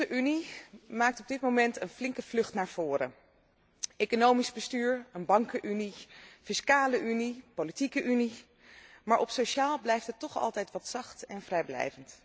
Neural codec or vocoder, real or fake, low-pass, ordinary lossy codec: none; real; none; none